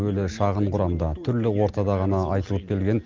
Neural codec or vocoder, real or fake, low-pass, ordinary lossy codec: none; real; 7.2 kHz; Opus, 24 kbps